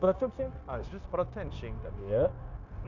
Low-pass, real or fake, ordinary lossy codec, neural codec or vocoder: 7.2 kHz; fake; none; codec, 16 kHz, 0.9 kbps, LongCat-Audio-Codec